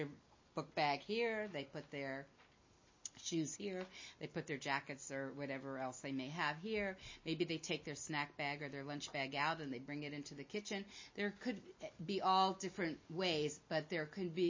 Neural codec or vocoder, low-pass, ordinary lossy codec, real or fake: none; 7.2 kHz; MP3, 32 kbps; real